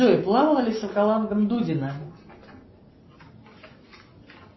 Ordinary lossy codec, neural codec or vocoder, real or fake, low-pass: MP3, 24 kbps; none; real; 7.2 kHz